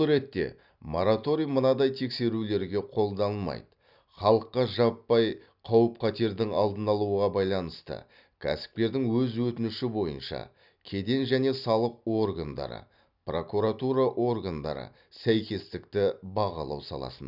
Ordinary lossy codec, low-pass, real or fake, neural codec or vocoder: none; 5.4 kHz; real; none